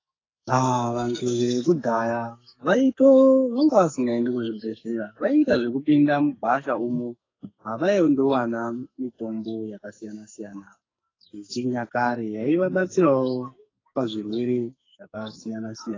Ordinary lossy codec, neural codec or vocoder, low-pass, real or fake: AAC, 32 kbps; codec, 44.1 kHz, 2.6 kbps, SNAC; 7.2 kHz; fake